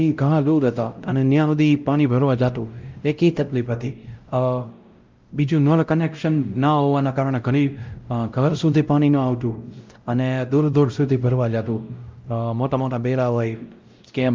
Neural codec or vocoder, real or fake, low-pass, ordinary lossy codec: codec, 16 kHz, 0.5 kbps, X-Codec, WavLM features, trained on Multilingual LibriSpeech; fake; 7.2 kHz; Opus, 24 kbps